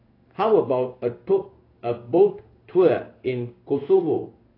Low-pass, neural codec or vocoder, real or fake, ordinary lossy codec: 5.4 kHz; codec, 16 kHz in and 24 kHz out, 1 kbps, XY-Tokenizer; fake; AAC, 24 kbps